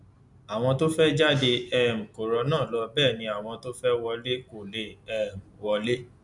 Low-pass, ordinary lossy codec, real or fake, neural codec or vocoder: 10.8 kHz; none; real; none